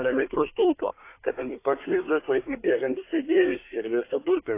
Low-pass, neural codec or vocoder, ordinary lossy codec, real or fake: 3.6 kHz; codec, 24 kHz, 1 kbps, SNAC; AAC, 24 kbps; fake